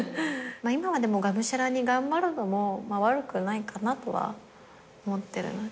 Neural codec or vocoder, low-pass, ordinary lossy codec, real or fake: none; none; none; real